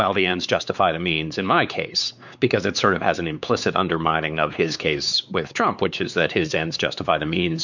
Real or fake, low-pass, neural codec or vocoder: fake; 7.2 kHz; codec, 16 kHz, 4 kbps, X-Codec, WavLM features, trained on Multilingual LibriSpeech